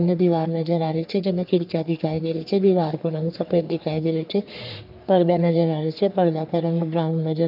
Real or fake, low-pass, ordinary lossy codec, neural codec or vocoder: fake; 5.4 kHz; none; codec, 44.1 kHz, 3.4 kbps, Pupu-Codec